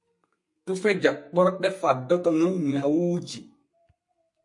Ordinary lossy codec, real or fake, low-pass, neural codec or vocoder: MP3, 48 kbps; fake; 10.8 kHz; codec, 32 kHz, 1.9 kbps, SNAC